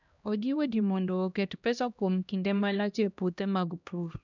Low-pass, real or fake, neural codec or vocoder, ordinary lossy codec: 7.2 kHz; fake; codec, 16 kHz, 1 kbps, X-Codec, HuBERT features, trained on LibriSpeech; none